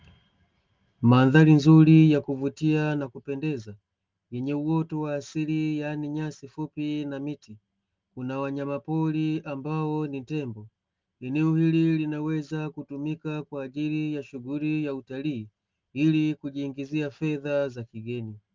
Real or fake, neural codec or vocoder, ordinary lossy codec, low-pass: real; none; Opus, 24 kbps; 7.2 kHz